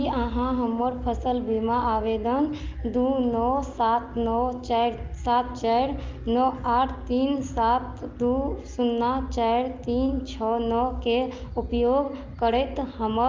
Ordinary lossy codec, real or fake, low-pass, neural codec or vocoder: Opus, 24 kbps; real; 7.2 kHz; none